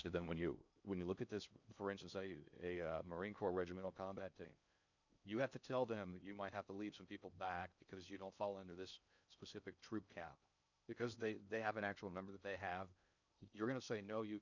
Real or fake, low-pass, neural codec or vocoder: fake; 7.2 kHz; codec, 16 kHz in and 24 kHz out, 0.6 kbps, FocalCodec, streaming, 4096 codes